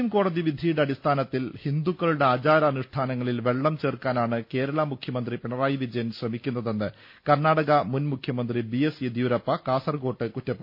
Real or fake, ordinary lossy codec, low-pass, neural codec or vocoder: real; MP3, 32 kbps; 5.4 kHz; none